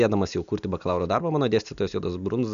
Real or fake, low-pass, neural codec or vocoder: real; 7.2 kHz; none